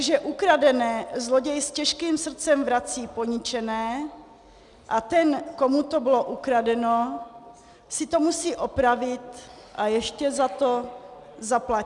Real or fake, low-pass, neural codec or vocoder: real; 10.8 kHz; none